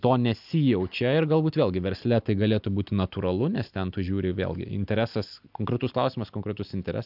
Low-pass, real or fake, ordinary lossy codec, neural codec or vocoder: 5.4 kHz; real; AAC, 48 kbps; none